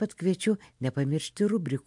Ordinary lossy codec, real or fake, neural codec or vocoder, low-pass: MP3, 64 kbps; real; none; 10.8 kHz